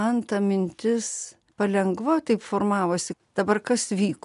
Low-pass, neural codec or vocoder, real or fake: 10.8 kHz; none; real